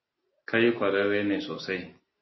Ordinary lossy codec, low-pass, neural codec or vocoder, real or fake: MP3, 24 kbps; 7.2 kHz; none; real